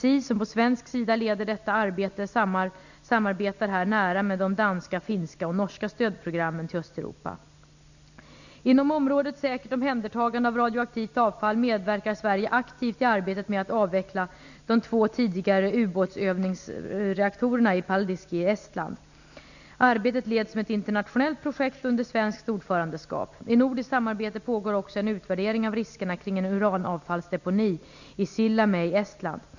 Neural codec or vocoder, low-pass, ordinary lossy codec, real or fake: none; 7.2 kHz; none; real